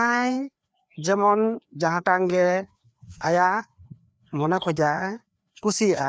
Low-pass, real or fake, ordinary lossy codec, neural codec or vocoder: none; fake; none; codec, 16 kHz, 2 kbps, FreqCodec, larger model